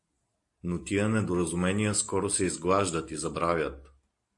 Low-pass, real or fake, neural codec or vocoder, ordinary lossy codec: 10.8 kHz; real; none; AAC, 64 kbps